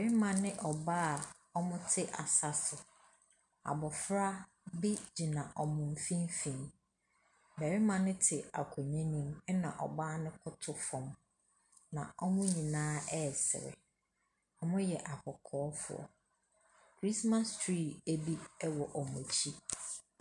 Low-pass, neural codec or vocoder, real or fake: 10.8 kHz; none; real